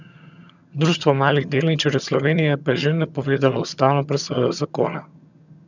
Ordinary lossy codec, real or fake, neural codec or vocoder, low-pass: none; fake; vocoder, 22.05 kHz, 80 mel bands, HiFi-GAN; 7.2 kHz